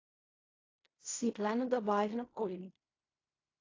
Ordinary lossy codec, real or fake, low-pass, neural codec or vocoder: AAC, 32 kbps; fake; 7.2 kHz; codec, 16 kHz in and 24 kHz out, 0.4 kbps, LongCat-Audio-Codec, fine tuned four codebook decoder